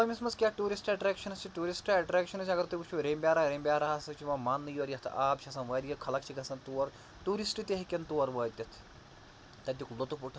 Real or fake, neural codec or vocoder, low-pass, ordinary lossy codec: real; none; none; none